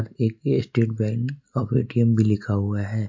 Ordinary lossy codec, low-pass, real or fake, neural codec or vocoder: MP3, 48 kbps; 7.2 kHz; real; none